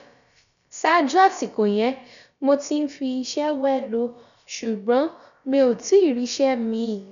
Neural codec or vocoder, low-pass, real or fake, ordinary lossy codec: codec, 16 kHz, about 1 kbps, DyCAST, with the encoder's durations; 7.2 kHz; fake; MP3, 96 kbps